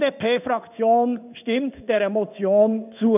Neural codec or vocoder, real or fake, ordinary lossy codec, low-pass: codec, 16 kHz in and 24 kHz out, 1 kbps, XY-Tokenizer; fake; none; 3.6 kHz